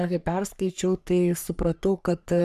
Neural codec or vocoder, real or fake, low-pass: codec, 44.1 kHz, 3.4 kbps, Pupu-Codec; fake; 14.4 kHz